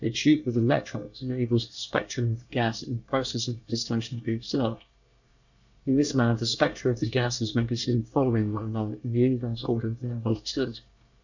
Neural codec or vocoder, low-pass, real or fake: codec, 24 kHz, 1 kbps, SNAC; 7.2 kHz; fake